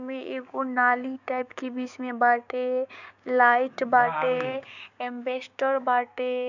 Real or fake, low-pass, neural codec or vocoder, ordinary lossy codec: fake; 7.2 kHz; codec, 16 kHz, 6 kbps, DAC; none